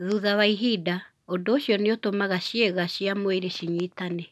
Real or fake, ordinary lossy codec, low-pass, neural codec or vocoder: real; none; none; none